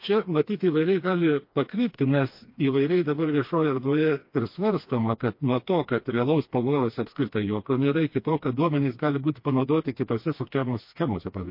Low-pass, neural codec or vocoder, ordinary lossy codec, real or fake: 5.4 kHz; codec, 16 kHz, 2 kbps, FreqCodec, smaller model; MP3, 32 kbps; fake